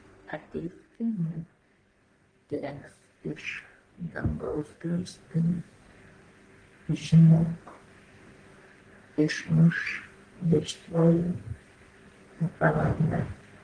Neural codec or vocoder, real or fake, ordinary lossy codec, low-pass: codec, 44.1 kHz, 1.7 kbps, Pupu-Codec; fake; Opus, 24 kbps; 9.9 kHz